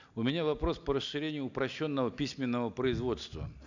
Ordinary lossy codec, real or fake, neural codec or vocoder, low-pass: none; real; none; 7.2 kHz